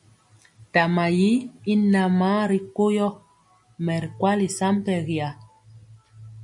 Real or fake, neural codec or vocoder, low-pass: real; none; 10.8 kHz